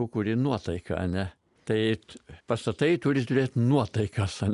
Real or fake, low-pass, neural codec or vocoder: real; 10.8 kHz; none